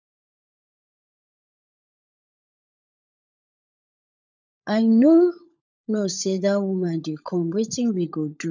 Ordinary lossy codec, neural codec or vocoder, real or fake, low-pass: none; codec, 16 kHz, 16 kbps, FunCodec, trained on LibriTTS, 50 frames a second; fake; 7.2 kHz